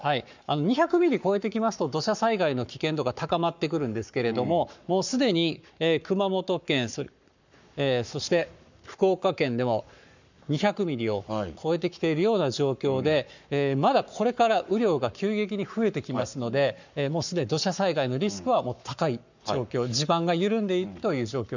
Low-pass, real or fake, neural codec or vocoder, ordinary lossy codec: 7.2 kHz; fake; codec, 44.1 kHz, 7.8 kbps, Pupu-Codec; none